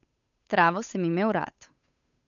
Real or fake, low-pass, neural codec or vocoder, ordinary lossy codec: fake; 7.2 kHz; codec, 16 kHz, 8 kbps, FunCodec, trained on Chinese and English, 25 frames a second; none